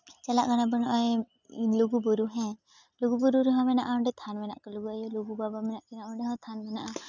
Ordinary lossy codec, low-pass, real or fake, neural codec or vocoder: none; 7.2 kHz; real; none